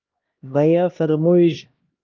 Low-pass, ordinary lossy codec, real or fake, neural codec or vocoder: 7.2 kHz; Opus, 32 kbps; fake; codec, 16 kHz, 1 kbps, X-Codec, HuBERT features, trained on LibriSpeech